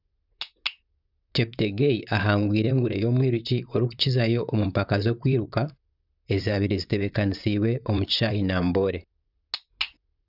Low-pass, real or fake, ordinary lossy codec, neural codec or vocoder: 5.4 kHz; fake; none; vocoder, 44.1 kHz, 128 mel bands, Pupu-Vocoder